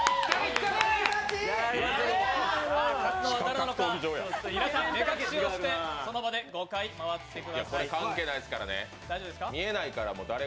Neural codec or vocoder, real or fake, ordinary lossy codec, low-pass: none; real; none; none